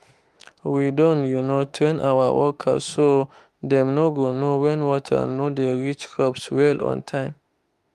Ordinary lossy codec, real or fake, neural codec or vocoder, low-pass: Opus, 32 kbps; fake; autoencoder, 48 kHz, 32 numbers a frame, DAC-VAE, trained on Japanese speech; 14.4 kHz